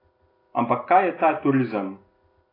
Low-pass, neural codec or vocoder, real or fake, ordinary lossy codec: 5.4 kHz; none; real; AAC, 24 kbps